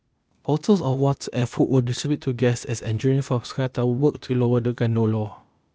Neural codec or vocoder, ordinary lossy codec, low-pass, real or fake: codec, 16 kHz, 0.8 kbps, ZipCodec; none; none; fake